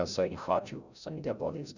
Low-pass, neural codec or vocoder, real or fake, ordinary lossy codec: 7.2 kHz; codec, 16 kHz, 0.5 kbps, FreqCodec, larger model; fake; none